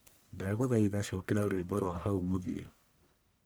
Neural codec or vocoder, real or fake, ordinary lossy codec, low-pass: codec, 44.1 kHz, 1.7 kbps, Pupu-Codec; fake; none; none